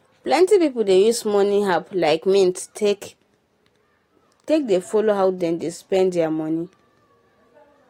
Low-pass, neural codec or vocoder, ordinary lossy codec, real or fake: 19.8 kHz; none; AAC, 48 kbps; real